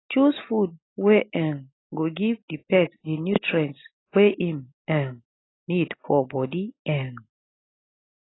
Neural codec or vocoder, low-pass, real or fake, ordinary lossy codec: none; 7.2 kHz; real; AAC, 16 kbps